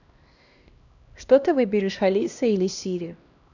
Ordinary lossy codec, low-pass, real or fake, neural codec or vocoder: none; 7.2 kHz; fake; codec, 16 kHz, 1 kbps, X-Codec, HuBERT features, trained on LibriSpeech